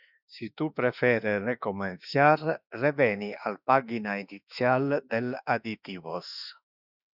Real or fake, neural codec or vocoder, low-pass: fake; autoencoder, 48 kHz, 32 numbers a frame, DAC-VAE, trained on Japanese speech; 5.4 kHz